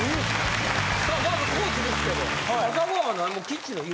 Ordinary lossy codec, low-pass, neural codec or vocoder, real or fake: none; none; none; real